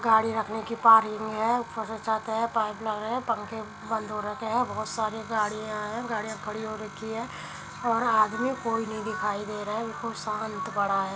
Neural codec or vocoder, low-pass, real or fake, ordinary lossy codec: none; none; real; none